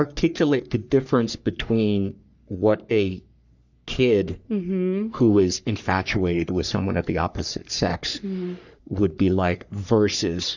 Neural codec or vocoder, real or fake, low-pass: codec, 44.1 kHz, 3.4 kbps, Pupu-Codec; fake; 7.2 kHz